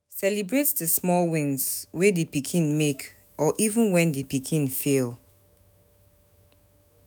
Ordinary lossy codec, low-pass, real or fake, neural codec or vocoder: none; none; fake; autoencoder, 48 kHz, 128 numbers a frame, DAC-VAE, trained on Japanese speech